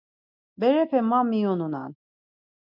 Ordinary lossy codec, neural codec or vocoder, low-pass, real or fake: MP3, 48 kbps; none; 5.4 kHz; real